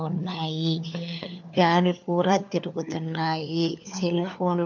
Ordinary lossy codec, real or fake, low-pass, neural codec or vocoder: none; fake; 7.2 kHz; codec, 16 kHz, 4 kbps, FunCodec, trained on LibriTTS, 50 frames a second